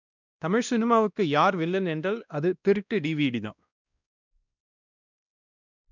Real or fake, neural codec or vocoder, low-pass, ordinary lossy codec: fake; codec, 16 kHz, 1 kbps, X-Codec, WavLM features, trained on Multilingual LibriSpeech; 7.2 kHz; none